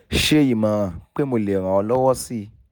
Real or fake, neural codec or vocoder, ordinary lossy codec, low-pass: real; none; none; none